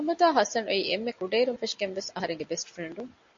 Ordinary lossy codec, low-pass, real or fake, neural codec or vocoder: MP3, 48 kbps; 7.2 kHz; real; none